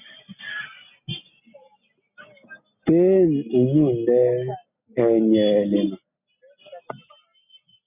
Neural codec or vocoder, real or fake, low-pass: none; real; 3.6 kHz